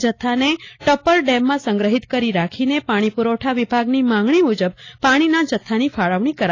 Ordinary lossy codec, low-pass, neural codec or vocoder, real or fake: AAC, 32 kbps; 7.2 kHz; none; real